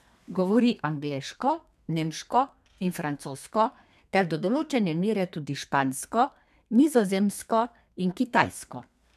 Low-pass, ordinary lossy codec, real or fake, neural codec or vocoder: 14.4 kHz; none; fake; codec, 32 kHz, 1.9 kbps, SNAC